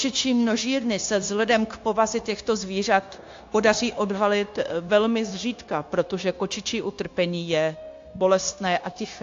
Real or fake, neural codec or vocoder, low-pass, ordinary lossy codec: fake; codec, 16 kHz, 0.9 kbps, LongCat-Audio-Codec; 7.2 kHz; AAC, 48 kbps